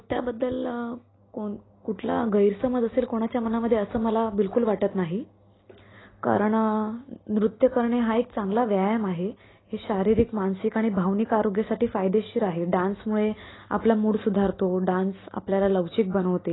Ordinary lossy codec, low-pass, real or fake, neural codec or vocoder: AAC, 16 kbps; 7.2 kHz; real; none